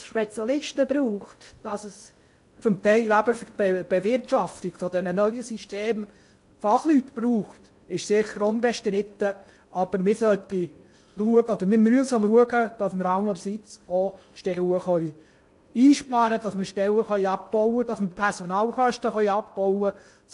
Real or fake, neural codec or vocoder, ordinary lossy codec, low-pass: fake; codec, 16 kHz in and 24 kHz out, 0.6 kbps, FocalCodec, streaming, 2048 codes; AAC, 64 kbps; 10.8 kHz